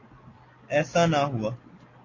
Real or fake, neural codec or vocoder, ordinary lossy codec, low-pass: real; none; AAC, 32 kbps; 7.2 kHz